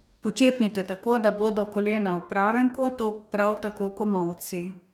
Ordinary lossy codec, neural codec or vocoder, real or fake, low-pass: none; codec, 44.1 kHz, 2.6 kbps, DAC; fake; 19.8 kHz